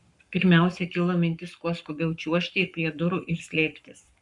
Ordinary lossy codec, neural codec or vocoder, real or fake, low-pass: MP3, 96 kbps; codec, 44.1 kHz, 7.8 kbps, Pupu-Codec; fake; 10.8 kHz